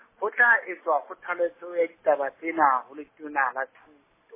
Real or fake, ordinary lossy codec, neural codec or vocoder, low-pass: real; MP3, 16 kbps; none; 3.6 kHz